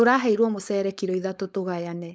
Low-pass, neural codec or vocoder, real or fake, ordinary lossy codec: none; codec, 16 kHz, 4.8 kbps, FACodec; fake; none